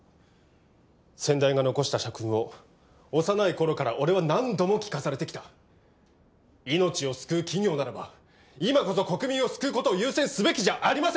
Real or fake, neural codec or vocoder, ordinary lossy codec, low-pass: real; none; none; none